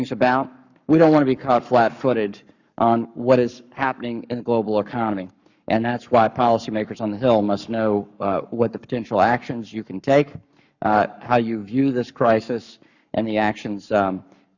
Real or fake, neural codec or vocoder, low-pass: fake; codec, 44.1 kHz, 7.8 kbps, Pupu-Codec; 7.2 kHz